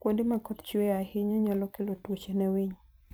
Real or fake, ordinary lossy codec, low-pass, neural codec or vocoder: fake; none; none; vocoder, 44.1 kHz, 128 mel bands every 256 samples, BigVGAN v2